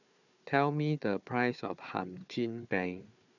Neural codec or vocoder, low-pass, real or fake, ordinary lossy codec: codec, 16 kHz, 4 kbps, FunCodec, trained on Chinese and English, 50 frames a second; 7.2 kHz; fake; none